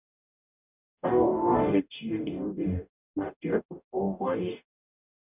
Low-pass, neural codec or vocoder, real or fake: 3.6 kHz; codec, 44.1 kHz, 0.9 kbps, DAC; fake